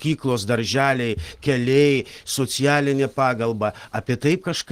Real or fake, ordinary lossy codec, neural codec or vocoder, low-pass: real; Opus, 16 kbps; none; 14.4 kHz